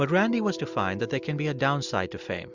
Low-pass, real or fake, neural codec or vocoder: 7.2 kHz; real; none